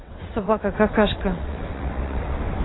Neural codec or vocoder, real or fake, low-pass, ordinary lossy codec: vocoder, 22.05 kHz, 80 mel bands, WaveNeXt; fake; 7.2 kHz; AAC, 16 kbps